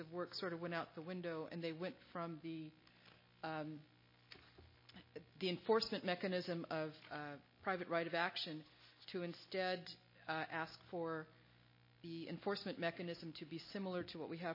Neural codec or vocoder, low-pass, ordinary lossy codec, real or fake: none; 5.4 kHz; MP3, 24 kbps; real